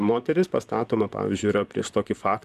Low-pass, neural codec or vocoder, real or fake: 14.4 kHz; vocoder, 44.1 kHz, 128 mel bands, Pupu-Vocoder; fake